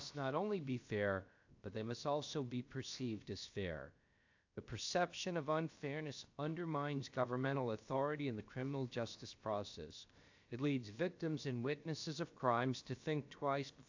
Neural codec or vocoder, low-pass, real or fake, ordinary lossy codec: codec, 16 kHz, about 1 kbps, DyCAST, with the encoder's durations; 7.2 kHz; fake; AAC, 48 kbps